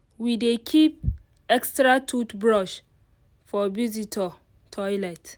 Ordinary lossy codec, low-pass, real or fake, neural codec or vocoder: none; none; real; none